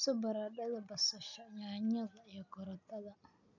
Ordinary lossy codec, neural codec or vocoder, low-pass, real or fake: none; none; 7.2 kHz; real